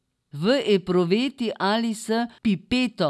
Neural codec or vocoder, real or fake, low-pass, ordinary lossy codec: none; real; none; none